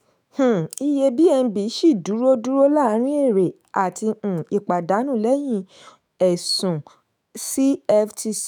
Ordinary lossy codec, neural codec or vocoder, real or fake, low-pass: none; autoencoder, 48 kHz, 128 numbers a frame, DAC-VAE, trained on Japanese speech; fake; none